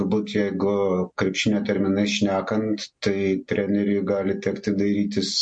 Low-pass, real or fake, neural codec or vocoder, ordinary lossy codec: 9.9 kHz; real; none; MP3, 48 kbps